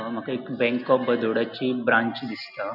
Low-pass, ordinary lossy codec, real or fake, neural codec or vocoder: 5.4 kHz; none; real; none